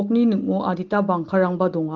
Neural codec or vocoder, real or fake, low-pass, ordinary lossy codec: none; real; 7.2 kHz; Opus, 16 kbps